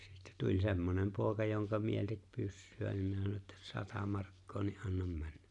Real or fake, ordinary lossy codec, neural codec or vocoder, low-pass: real; none; none; 10.8 kHz